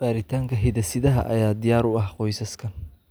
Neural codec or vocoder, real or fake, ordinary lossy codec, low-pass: none; real; none; none